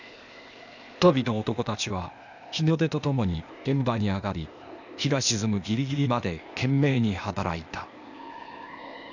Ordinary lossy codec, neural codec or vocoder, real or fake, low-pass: none; codec, 16 kHz, 0.8 kbps, ZipCodec; fake; 7.2 kHz